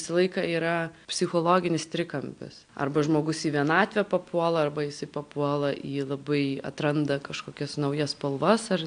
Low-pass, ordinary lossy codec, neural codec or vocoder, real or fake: 9.9 kHz; AAC, 64 kbps; none; real